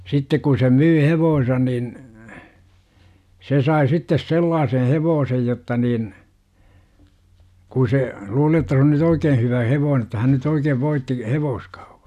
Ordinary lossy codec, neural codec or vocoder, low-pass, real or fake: none; none; 14.4 kHz; real